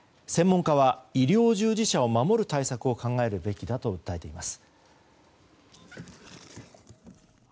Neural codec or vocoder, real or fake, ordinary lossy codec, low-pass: none; real; none; none